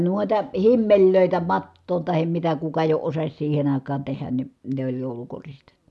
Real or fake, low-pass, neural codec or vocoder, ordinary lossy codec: real; none; none; none